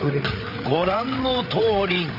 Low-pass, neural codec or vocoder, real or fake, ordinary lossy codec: 5.4 kHz; codec, 16 kHz, 8 kbps, FreqCodec, larger model; fake; Opus, 64 kbps